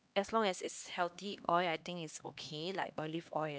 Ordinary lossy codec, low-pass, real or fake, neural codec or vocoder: none; none; fake; codec, 16 kHz, 2 kbps, X-Codec, HuBERT features, trained on LibriSpeech